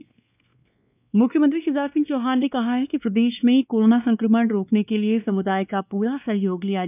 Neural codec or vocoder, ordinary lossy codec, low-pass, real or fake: codec, 16 kHz, 2 kbps, X-Codec, WavLM features, trained on Multilingual LibriSpeech; none; 3.6 kHz; fake